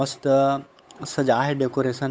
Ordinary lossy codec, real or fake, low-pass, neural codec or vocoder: none; fake; none; codec, 16 kHz, 8 kbps, FunCodec, trained on Chinese and English, 25 frames a second